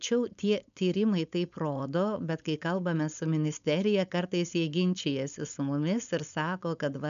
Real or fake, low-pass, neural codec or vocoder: fake; 7.2 kHz; codec, 16 kHz, 4.8 kbps, FACodec